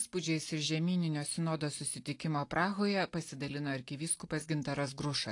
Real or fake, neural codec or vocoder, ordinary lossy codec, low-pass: real; none; AAC, 48 kbps; 10.8 kHz